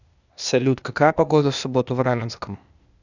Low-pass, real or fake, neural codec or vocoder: 7.2 kHz; fake; codec, 16 kHz, 0.8 kbps, ZipCodec